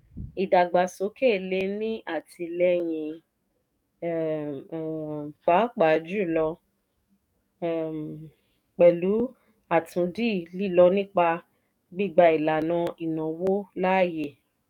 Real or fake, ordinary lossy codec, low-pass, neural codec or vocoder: fake; none; 19.8 kHz; codec, 44.1 kHz, 7.8 kbps, DAC